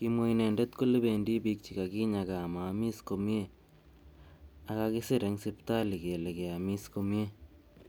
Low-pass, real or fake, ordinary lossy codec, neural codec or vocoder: none; real; none; none